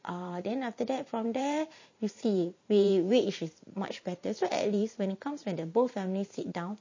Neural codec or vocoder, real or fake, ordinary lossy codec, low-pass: vocoder, 44.1 kHz, 128 mel bands every 512 samples, BigVGAN v2; fake; MP3, 32 kbps; 7.2 kHz